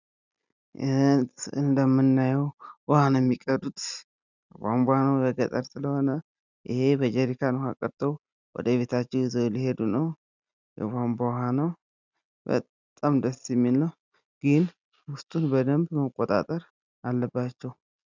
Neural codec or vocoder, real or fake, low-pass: none; real; 7.2 kHz